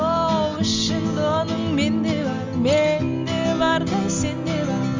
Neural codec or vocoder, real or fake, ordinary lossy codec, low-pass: none; real; Opus, 32 kbps; 7.2 kHz